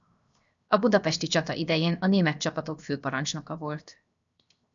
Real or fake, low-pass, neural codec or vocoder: fake; 7.2 kHz; codec, 16 kHz, 0.7 kbps, FocalCodec